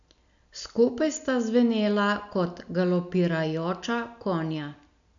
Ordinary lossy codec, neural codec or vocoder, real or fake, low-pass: none; none; real; 7.2 kHz